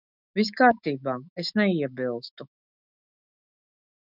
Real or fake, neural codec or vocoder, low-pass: fake; autoencoder, 48 kHz, 128 numbers a frame, DAC-VAE, trained on Japanese speech; 5.4 kHz